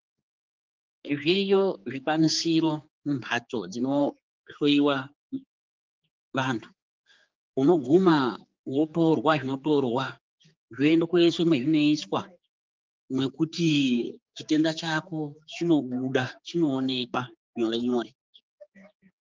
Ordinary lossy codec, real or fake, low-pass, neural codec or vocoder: Opus, 32 kbps; fake; 7.2 kHz; codec, 16 kHz, 4 kbps, X-Codec, HuBERT features, trained on general audio